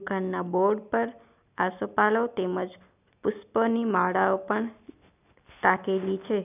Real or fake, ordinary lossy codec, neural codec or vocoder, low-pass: real; none; none; 3.6 kHz